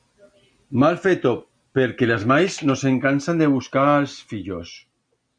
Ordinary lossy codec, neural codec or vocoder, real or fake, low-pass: MP3, 96 kbps; none; real; 9.9 kHz